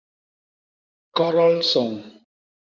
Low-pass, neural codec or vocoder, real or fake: 7.2 kHz; codec, 16 kHz in and 24 kHz out, 2.2 kbps, FireRedTTS-2 codec; fake